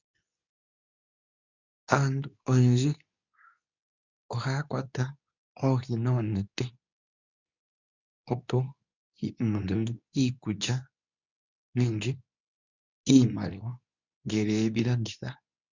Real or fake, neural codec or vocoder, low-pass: fake; codec, 24 kHz, 0.9 kbps, WavTokenizer, medium speech release version 2; 7.2 kHz